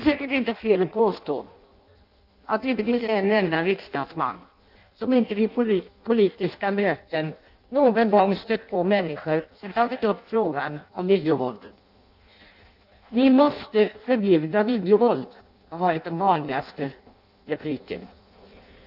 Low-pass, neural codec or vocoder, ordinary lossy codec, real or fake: 5.4 kHz; codec, 16 kHz in and 24 kHz out, 0.6 kbps, FireRedTTS-2 codec; none; fake